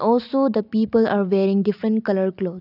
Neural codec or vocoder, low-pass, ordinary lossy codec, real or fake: none; 5.4 kHz; none; real